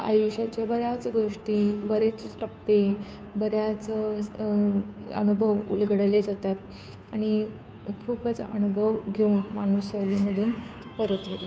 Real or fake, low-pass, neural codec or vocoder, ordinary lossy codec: fake; none; codec, 16 kHz, 2 kbps, FunCodec, trained on Chinese and English, 25 frames a second; none